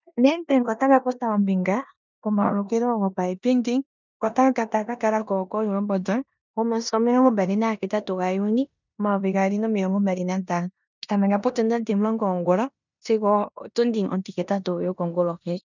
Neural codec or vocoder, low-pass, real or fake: codec, 16 kHz in and 24 kHz out, 0.9 kbps, LongCat-Audio-Codec, four codebook decoder; 7.2 kHz; fake